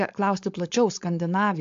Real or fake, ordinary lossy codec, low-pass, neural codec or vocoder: fake; AAC, 64 kbps; 7.2 kHz; codec, 16 kHz, 4.8 kbps, FACodec